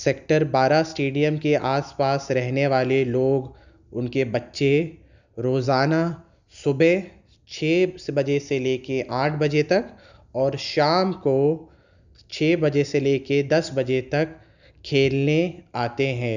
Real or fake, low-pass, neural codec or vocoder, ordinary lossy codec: real; 7.2 kHz; none; none